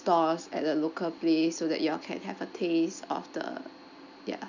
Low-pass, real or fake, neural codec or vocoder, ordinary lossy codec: 7.2 kHz; real; none; none